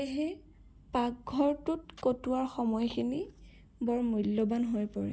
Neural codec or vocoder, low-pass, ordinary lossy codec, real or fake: none; none; none; real